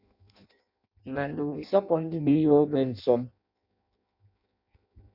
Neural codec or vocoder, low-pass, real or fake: codec, 16 kHz in and 24 kHz out, 0.6 kbps, FireRedTTS-2 codec; 5.4 kHz; fake